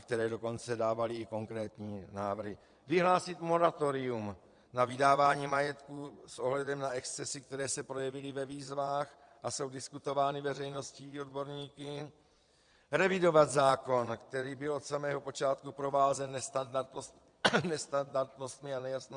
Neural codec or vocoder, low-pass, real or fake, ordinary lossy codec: vocoder, 22.05 kHz, 80 mel bands, Vocos; 9.9 kHz; fake; MP3, 96 kbps